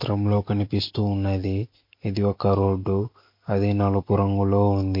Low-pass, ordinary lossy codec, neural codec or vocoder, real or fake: 5.4 kHz; MP3, 32 kbps; none; real